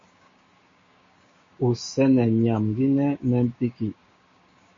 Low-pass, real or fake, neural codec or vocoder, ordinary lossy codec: 7.2 kHz; fake; codec, 16 kHz, 6 kbps, DAC; MP3, 32 kbps